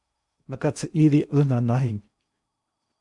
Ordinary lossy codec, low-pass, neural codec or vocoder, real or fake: MP3, 96 kbps; 10.8 kHz; codec, 16 kHz in and 24 kHz out, 0.8 kbps, FocalCodec, streaming, 65536 codes; fake